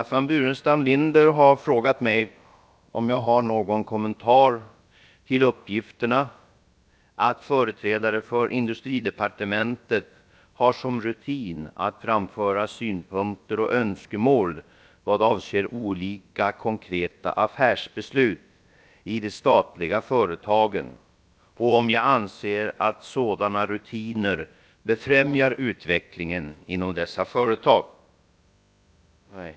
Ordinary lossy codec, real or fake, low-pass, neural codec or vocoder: none; fake; none; codec, 16 kHz, about 1 kbps, DyCAST, with the encoder's durations